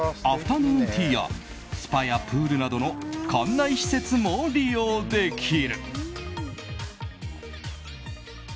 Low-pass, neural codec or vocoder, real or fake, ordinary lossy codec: none; none; real; none